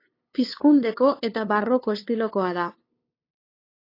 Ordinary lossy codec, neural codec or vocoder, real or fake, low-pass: AAC, 32 kbps; codec, 16 kHz, 2 kbps, FunCodec, trained on LibriTTS, 25 frames a second; fake; 5.4 kHz